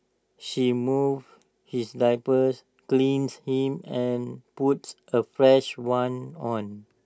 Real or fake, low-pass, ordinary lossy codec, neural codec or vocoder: real; none; none; none